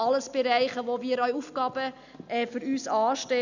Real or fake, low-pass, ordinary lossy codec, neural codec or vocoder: real; 7.2 kHz; none; none